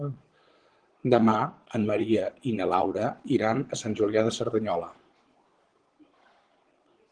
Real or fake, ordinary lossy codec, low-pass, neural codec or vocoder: fake; Opus, 16 kbps; 9.9 kHz; vocoder, 22.05 kHz, 80 mel bands, Vocos